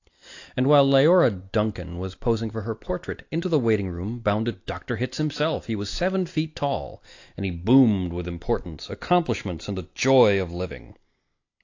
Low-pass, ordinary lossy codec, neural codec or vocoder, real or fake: 7.2 kHz; AAC, 48 kbps; none; real